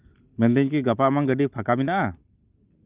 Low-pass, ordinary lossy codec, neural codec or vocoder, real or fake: 3.6 kHz; Opus, 32 kbps; none; real